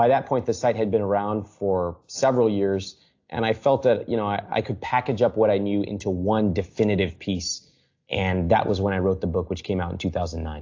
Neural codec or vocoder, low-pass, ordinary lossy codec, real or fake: none; 7.2 kHz; AAC, 48 kbps; real